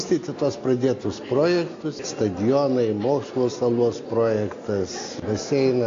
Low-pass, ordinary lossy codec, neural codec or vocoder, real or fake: 7.2 kHz; AAC, 48 kbps; none; real